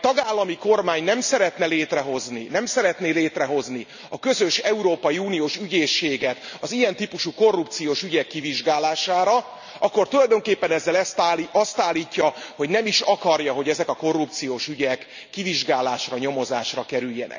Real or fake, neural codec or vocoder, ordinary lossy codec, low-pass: real; none; none; 7.2 kHz